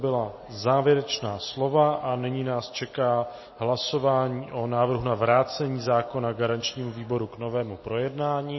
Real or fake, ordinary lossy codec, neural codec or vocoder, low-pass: real; MP3, 24 kbps; none; 7.2 kHz